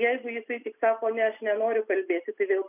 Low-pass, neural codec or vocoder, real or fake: 3.6 kHz; none; real